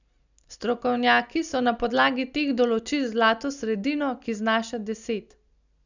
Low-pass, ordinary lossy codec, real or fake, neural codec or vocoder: 7.2 kHz; none; real; none